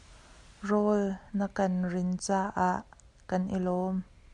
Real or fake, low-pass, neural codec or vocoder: real; 10.8 kHz; none